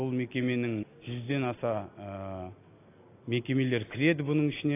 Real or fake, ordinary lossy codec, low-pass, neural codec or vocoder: real; AAC, 24 kbps; 3.6 kHz; none